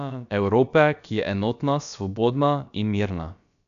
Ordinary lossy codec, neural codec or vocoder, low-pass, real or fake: none; codec, 16 kHz, about 1 kbps, DyCAST, with the encoder's durations; 7.2 kHz; fake